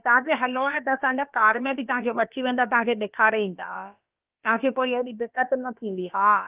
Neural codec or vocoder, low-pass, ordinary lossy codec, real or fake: codec, 16 kHz, about 1 kbps, DyCAST, with the encoder's durations; 3.6 kHz; Opus, 24 kbps; fake